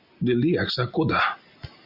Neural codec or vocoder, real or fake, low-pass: none; real; 5.4 kHz